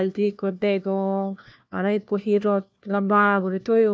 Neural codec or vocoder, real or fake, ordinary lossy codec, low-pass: codec, 16 kHz, 1 kbps, FunCodec, trained on LibriTTS, 50 frames a second; fake; none; none